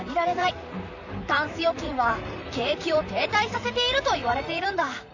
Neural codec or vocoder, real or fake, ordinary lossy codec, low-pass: vocoder, 44.1 kHz, 128 mel bands, Pupu-Vocoder; fake; none; 7.2 kHz